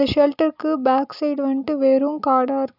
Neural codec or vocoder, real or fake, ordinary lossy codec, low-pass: none; real; none; 5.4 kHz